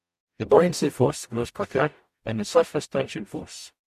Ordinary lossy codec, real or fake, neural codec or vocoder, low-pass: MP3, 64 kbps; fake; codec, 44.1 kHz, 0.9 kbps, DAC; 14.4 kHz